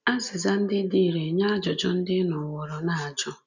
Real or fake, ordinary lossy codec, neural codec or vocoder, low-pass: real; none; none; 7.2 kHz